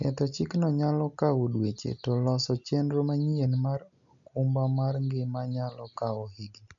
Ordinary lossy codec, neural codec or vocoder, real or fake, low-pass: none; none; real; 7.2 kHz